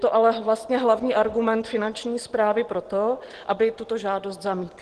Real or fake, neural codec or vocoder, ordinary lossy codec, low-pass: real; none; Opus, 16 kbps; 10.8 kHz